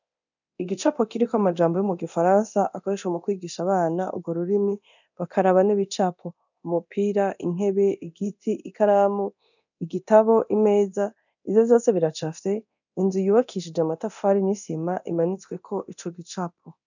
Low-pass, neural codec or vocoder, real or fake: 7.2 kHz; codec, 24 kHz, 0.9 kbps, DualCodec; fake